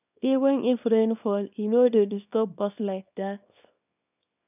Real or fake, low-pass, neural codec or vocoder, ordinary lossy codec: fake; 3.6 kHz; codec, 24 kHz, 0.9 kbps, WavTokenizer, small release; none